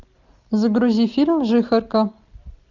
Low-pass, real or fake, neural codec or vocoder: 7.2 kHz; real; none